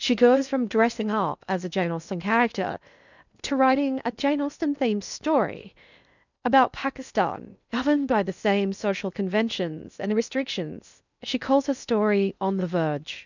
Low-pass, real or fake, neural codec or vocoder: 7.2 kHz; fake; codec, 16 kHz in and 24 kHz out, 0.6 kbps, FocalCodec, streaming, 2048 codes